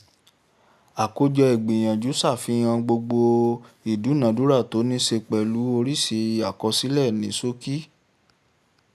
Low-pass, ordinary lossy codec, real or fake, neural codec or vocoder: 14.4 kHz; none; real; none